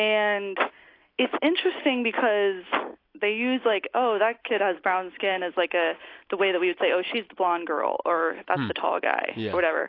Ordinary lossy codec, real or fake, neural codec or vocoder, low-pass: AAC, 32 kbps; real; none; 5.4 kHz